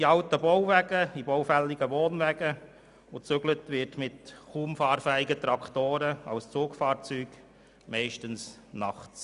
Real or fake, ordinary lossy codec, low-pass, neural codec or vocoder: real; none; 10.8 kHz; none